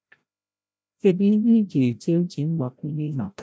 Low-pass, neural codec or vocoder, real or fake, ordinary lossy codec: none; codec, 16 kHz, 0.5 kbps, FreqCodec, larger model; fake; none